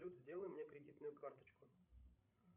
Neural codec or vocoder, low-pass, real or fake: codec, 16 kHz, 16 kbps, FreqCodec, larger model; 3.6 kHz; fake